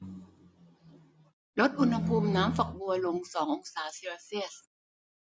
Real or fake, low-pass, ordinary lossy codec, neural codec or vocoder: real; none; none; none